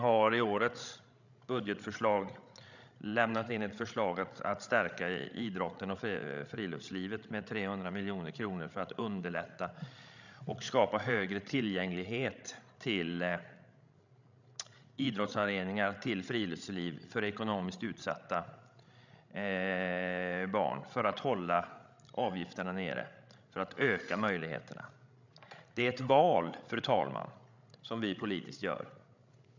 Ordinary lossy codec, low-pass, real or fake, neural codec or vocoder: none; 7.2 kHz; fake; codec, 16 kHz, 16 kbps, FreqCodec, larger model